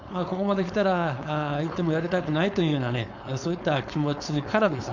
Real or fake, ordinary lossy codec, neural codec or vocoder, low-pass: fake; none; codec, 16 kHz, 4.8 kbps, FACodec; 7.2 kHz